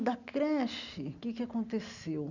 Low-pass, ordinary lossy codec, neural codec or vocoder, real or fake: 7.2 kHz; none; none; real